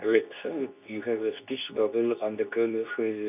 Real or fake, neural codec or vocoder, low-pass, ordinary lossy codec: fake; codec, 24 kHz, 0.9 kbps, WavTokenizer, medium speech release version 2; 3.6 kHz; none